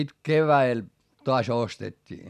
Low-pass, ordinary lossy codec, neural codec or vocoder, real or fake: 14.4 kHz; none; none; real